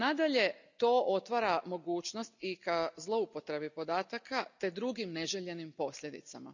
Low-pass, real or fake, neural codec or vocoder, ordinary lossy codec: 7.2 kHz; real; none; none